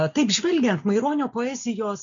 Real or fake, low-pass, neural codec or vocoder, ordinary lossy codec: real; 7.2 kHz; none; MP3, 64 kbps